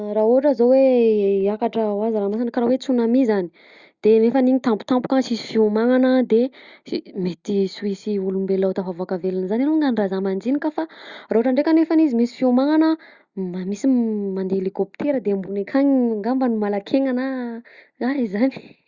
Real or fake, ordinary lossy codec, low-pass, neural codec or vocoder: real; Opus, 64 kbps; 7.2 kHz; none